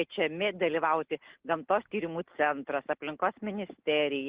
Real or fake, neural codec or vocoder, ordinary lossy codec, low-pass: real; none; Opus, 16 kbps; 3.6 kHz